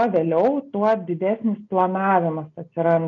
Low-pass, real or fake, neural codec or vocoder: 7.2 kHz; real; none